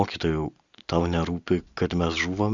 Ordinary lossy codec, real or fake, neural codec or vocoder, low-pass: Opus, 64 kbps; real; none; 7.2 kHz